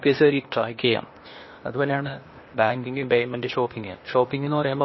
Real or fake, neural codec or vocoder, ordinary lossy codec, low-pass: fake; codec, 16 kHz, 0.8 kbps, ZipCodec; MP3, 24 kbps; 7.2 kHz